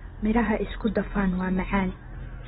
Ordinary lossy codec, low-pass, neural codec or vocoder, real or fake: AAC, 16 kbps; 19.8 kHz; none; real